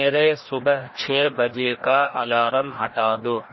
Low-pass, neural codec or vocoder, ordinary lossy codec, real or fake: 7.2 kHz; codec, 16 kHz, 1 kbps, FreqCodec, larger model; MP3, 24 kbps; fake